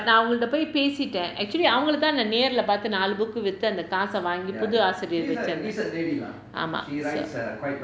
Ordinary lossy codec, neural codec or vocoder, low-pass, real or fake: none; none; none; real